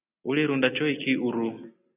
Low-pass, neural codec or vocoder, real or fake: 3.6 kHz; none; real